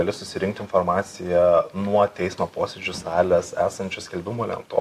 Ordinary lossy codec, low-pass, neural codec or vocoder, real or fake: AAC, 64 kbps; 14.4 kHz; none; real